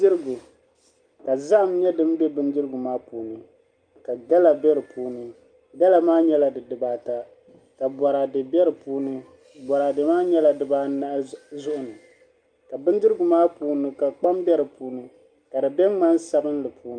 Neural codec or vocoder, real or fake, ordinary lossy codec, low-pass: none; real; Opus, 32 kbps; 9.9 kHz